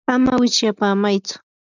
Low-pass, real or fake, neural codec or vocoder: 7.2 kHz; real; none